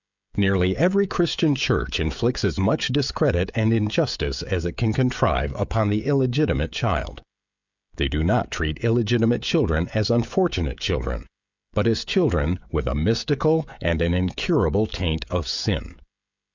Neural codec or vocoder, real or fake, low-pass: codec, 16 kHz, 16 kbps, FreqCodec, smaller model; fake; 7.2 kHz